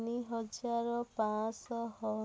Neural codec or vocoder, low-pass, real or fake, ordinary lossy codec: none; none; real; none